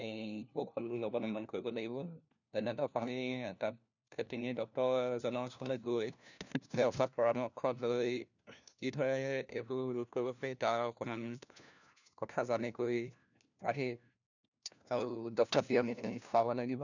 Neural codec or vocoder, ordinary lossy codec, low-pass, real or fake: codec, 16 kHz, 1 kbps, FunCodec, trained on LibriTTS, 50 frames a second; none; 7.2 kHz; fake